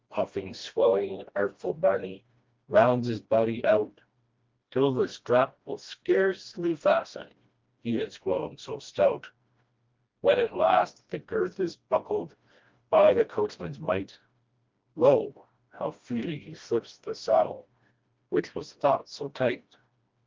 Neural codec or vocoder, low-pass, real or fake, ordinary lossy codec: codec, 16 kHz, 1 kbps, FreqCodec, smaller model; 7.2 kHz; fake; Opus, 32 kbps